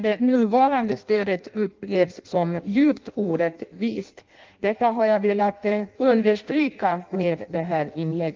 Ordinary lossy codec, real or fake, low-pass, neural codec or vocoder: Opus, 24 kbps; fake; 7.2 kHz; codec, 16 kHz in and 24 kHz out, 0.6 kbps, FireRedTTS-2 codec